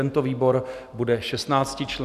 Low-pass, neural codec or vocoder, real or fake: 14.4 kHz; none; real